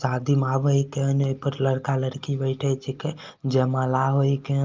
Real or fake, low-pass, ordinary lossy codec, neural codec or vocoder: real; 7.2 kHz; Opus, 24 kbps; none